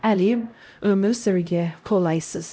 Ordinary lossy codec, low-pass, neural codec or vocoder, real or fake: none; none; codec, 16 kHz, 0.5 kbps, X-Codec, HuBERT features, trained on LibriSpeech; fake